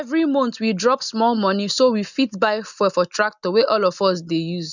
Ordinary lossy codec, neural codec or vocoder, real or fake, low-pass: none; none; real; 7.2 kHz